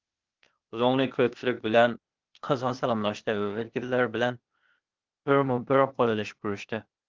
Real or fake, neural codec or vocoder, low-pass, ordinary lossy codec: fake; codec, 16 kHz, 0.8 kbps, ZipCodec; 7.2 kHz; Opus, 24 kbps